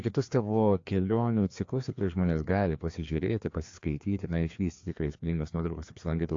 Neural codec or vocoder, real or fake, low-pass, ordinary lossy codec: codec, 16 kHz, 2 kbps, FreqCodec, larger model; fake; 7.2 kHz; AAC, 48 kbps